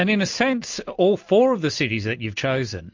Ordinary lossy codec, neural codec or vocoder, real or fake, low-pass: MP3, 48 kbps; none; real; 7.2 kHz